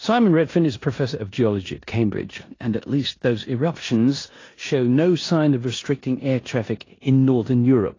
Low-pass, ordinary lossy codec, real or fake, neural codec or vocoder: 7.2 kHz; AAC, 32 kbps; fake; codec, 16 kHz in and 24 kHz out, 0.9 kbps, LongCat-Audio-Codec, four codebook decoder